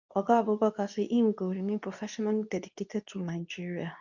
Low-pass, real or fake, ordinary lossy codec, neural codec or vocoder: 7.2 kHz; fake; none; codec, 24 kHz, 0.9 kbps, WavTokenizer, medium speech release version 2